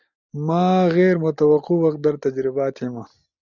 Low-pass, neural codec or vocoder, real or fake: 7.2 kHz; none; real